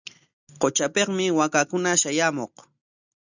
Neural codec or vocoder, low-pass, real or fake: none; 7.2 kHz; real